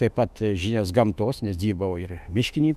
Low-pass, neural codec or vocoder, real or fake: 14.4 kHz; autoencoder, 48 kHz, 32 numbers a frame, DAC-VAE, trained on Japanese speech; fake